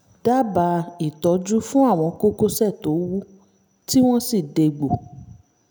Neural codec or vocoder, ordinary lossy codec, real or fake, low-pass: none; none; real; none